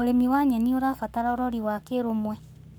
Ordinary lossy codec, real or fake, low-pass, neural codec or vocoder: none; fake; none; codec, 44.1 kHz, 7.8 kbps, Pupu-Codec